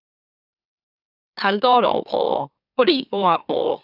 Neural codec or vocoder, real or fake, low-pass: autoencoder, 44.1 kHz, a latent of 192 numbers a frame, MeloTTS; fake; 5.4 kHz